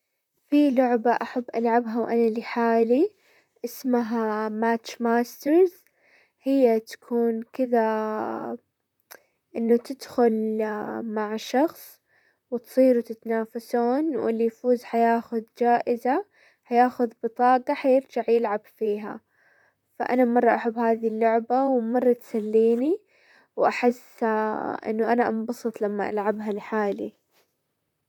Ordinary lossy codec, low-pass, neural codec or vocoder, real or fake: none; 19.8 kHz; vocoder, 44.1 kHz, 128 mel bands, Pupu-Vocoder; fake